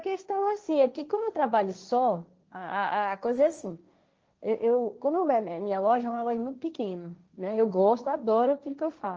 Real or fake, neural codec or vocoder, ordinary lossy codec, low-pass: fake; codec, 16 kHz, 1.1 kbps, Voila-Tokenizer; Opus, 24 kbps; 7.2 kHz